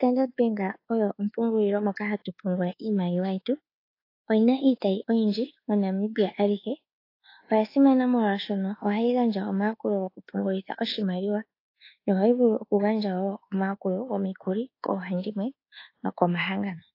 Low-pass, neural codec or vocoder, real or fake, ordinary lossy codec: 5.4 kHz; codec, 24 kHz, 1.2 kbps, DualCodec; fake; AAC, 32 kbps